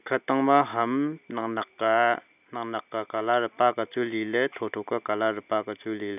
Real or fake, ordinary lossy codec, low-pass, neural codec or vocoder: real; none; 3.6 kHz; none